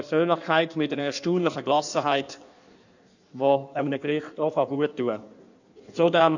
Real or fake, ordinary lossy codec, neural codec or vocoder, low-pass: fake; none; codec, 16 kHz in and 24 kHz out, 1.1 kbps, FireRedTTS-2 codec; 7.2 kHz